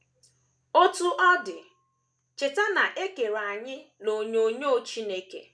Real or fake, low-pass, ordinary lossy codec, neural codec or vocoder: real; none; none; none